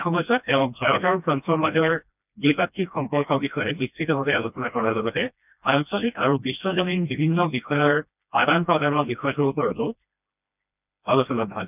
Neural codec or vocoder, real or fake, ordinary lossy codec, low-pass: codec, 16 kHz, 1 kbps, FreqCodec, smaller model; fake; none; 3.6 kHz